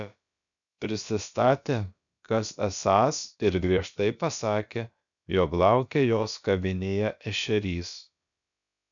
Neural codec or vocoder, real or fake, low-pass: codec, 16 kHz, about 1 kbps, DyCAST, with the encoder's durations; fake; 7.2 kHz